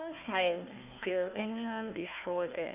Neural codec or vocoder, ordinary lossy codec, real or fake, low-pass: codec, 16 kHz, 1 kbps, FunCodec, trained on Chinese and English, 50 frames a second; none; fake; 3.6 kHz